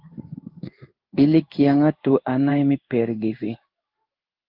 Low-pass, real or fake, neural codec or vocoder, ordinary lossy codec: 5.4 kHz; fake; codec, 16 kHz in and 24 kHz out, 1 kbps, XY-Tokenizer; Opus, 32 kbps